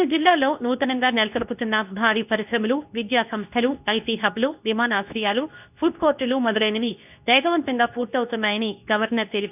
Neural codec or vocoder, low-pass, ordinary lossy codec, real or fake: codec, 24 kHz, 0.9 kbps, WavTokenizer, medium speech release version 2; 3.6 kHz; none; fake